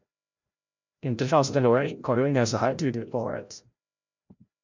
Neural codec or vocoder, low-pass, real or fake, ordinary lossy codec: codec, 16 kHz, 0.5 kbps, FreqCodec, larger model; 7.2 kHz; fake; MP3, 64 kbps